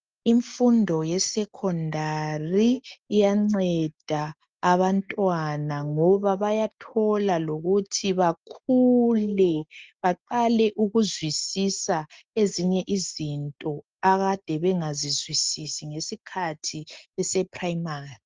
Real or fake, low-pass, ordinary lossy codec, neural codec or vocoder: real; 7.2 kHz; Opus, 32 kbps; none